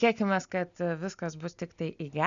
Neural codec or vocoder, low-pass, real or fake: none; 7.2 kHz; real